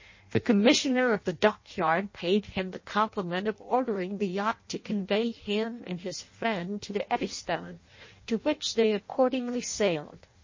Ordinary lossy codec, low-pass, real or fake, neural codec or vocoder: MP3, 32 kbps; 7.2 kHz; fake; codec, 16 kHz in and 24 kHz out, 0.6 kbps, FireRedTTS-2 codec